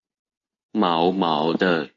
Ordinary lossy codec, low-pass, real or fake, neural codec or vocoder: AAC, 32 kbps; 7.2 kHz; real; none